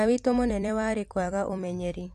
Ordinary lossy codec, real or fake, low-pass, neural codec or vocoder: AAC, 64 kbps; fake; 14.4 kHz; vocoder, 44.1 kHz, 128 mel bands every 256 samples, BigVGAN v2